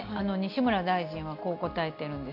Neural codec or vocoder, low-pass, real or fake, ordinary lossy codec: none; 5.4 kHz; real; none